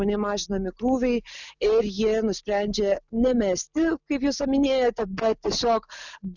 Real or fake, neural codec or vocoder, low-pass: real; none; 7.2 kHz